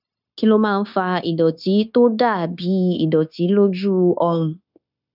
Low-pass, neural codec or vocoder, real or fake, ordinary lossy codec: 5.4 kHz; codec, 16 kHz, 0.9 kbps, LongCat-Audio-Codec; fake; none